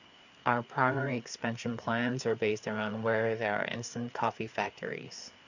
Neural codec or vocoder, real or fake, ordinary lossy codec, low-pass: codec, 16 kHz, 4 kbps, FreqCodec, larger model; fake; none; 7.2 kHz